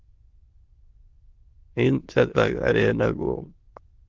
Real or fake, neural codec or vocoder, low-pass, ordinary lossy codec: fake; autoencoder, 22.05 kHz, a latent of 192 numbers a frame, VITS, trained on many speakers; 7.2 kHz; Opus, 16 kbps